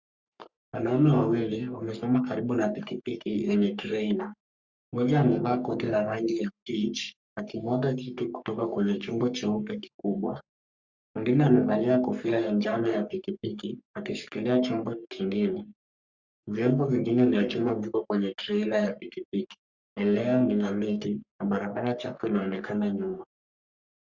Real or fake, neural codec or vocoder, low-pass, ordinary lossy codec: fake; codec, 44.1 kHz, 3.4 kbps, Pupu-Codec; 7.2 kHz; Opus, 64 kbps